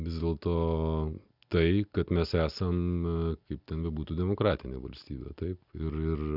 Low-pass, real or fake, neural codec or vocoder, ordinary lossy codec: 5.4 kHz; real; none; Opus, 64 kbps